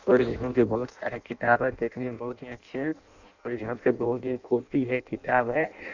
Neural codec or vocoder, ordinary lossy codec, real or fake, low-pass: codec, 16 kHz in and 24 kHz out, 0.6 kbps, FireRedTTS-2 codec; none; fake; 7.2 kHz